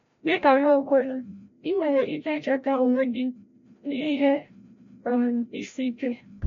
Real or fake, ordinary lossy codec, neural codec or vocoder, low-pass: fake; MP3, 48 kbps; codec, 16 kHz, 0.5 kbps, FreqCodec, larger model; 7.2 kHz